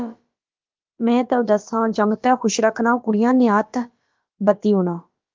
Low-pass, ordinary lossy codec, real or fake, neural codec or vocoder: 7.2 kHz; Opus, 32 kbps; fake; codec, 16 kHz, about 1 kbps, DyCAST, with the encoder's durations